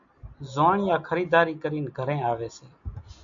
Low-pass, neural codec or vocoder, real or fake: 7.2 kHz; none; real